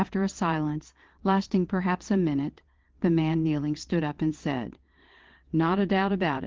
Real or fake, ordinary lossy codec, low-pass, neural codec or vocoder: fake; Opus, 16 kbps; 7.2 kHz; vocoder, 22.05 kHz, 80 mel bands, WaveNeXt